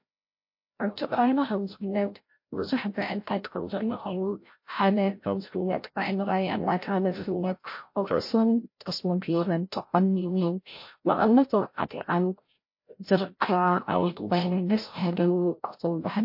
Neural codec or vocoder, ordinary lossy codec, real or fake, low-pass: codec, 16 kHz, 0.5 kbps, FreqCodec, larger model; MP3, 32 kbps; fake; 5.4 kHz